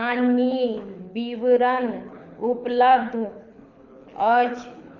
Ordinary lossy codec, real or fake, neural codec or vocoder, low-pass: none; fake; codec, 24 kHz, 6 kbps, HILCodec; 7.2 kHz